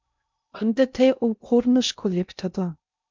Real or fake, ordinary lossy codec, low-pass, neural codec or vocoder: fake; MP3, 64 kbps; 7.2 kHz; codec, 16 kHz in and 24 kHz out, 0.6 kbps, FocalCodec, streaming, 2048 codes